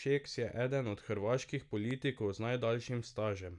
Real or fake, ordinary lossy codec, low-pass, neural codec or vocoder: real; none; 10.8 kHz; none